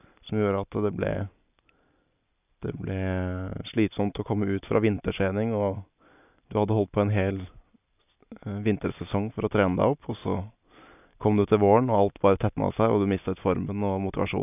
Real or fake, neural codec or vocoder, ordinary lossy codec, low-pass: real; none; none; 3.6 kHz